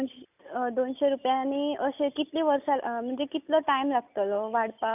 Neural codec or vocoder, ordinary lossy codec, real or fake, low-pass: none; none; real; 3.6 kHz